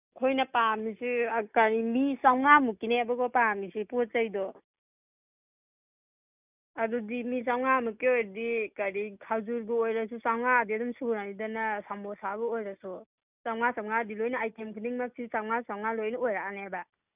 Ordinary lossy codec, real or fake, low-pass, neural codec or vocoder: none; real; 3.6 kHz; none